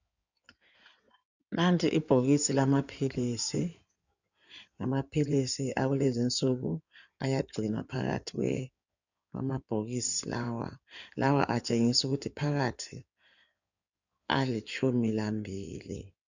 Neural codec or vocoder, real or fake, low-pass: codec, 16 kHz in and 24 kHz out, 2.2 kbps, FireRedTTS-2 codec; fake; 7.2 kHz